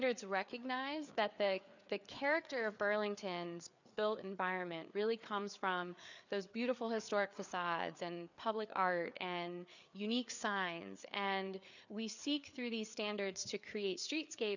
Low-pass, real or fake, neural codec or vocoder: 7.2 kHz; fake; codec, 16 kHz, 4 kbps, FreqCodec, larger model